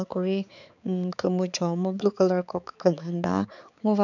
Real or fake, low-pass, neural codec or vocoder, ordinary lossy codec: fake; 7.2 kHz; codec, 16 kHz, 4 kbps, X-Codec, HuBERT features, trained on balanced general audio; none